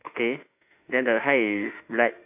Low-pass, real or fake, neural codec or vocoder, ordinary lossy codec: 3.6 kHz; fake; autoencoder, 48 kHz, 32 numbers a frame, DAC-VAE, trained on Japanese speech; AAC, 32 kbps